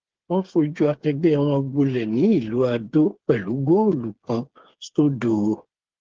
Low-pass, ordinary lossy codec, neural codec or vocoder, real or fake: 7.2 kHz; Opus, 16 kbps; codec, 16 kHz, 8 kbps, FreqCodec, smaller model; fake